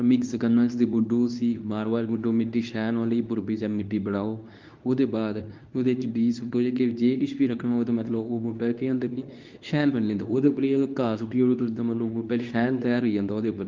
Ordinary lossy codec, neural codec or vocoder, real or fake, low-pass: Opus, 32 kbps; codec, 24 kHz, 0.9 kbps, WavTokenizer, medium speech release version 2; fake; 7.2 kHz